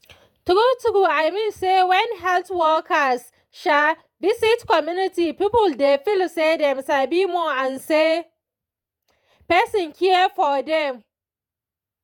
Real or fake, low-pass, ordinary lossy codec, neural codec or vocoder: fake; 19.8 kHz; none; vocoder, 48 kHz, 128 mel bands, Vocos